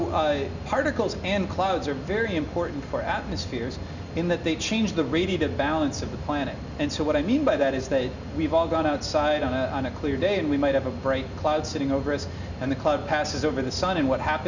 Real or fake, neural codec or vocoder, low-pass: real; none; 7.2 kHz